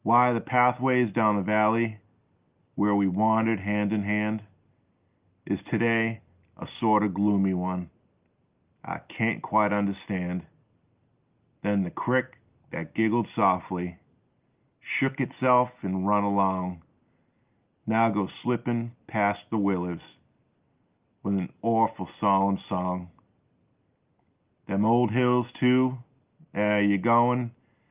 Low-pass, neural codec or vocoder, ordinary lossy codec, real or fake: 3.6 kHz; none; Opus, 32 kbps; real